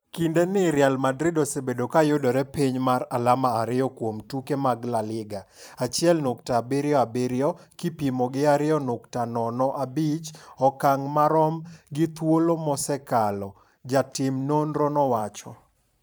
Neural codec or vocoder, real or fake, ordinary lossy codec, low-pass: vocoder, 44.1 kHz, 128 mel bands every 512 samples, BigVGAN v2; fake; none; none